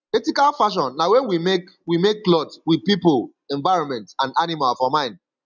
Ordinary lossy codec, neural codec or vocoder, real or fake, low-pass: none; none; real; 7.2 kHz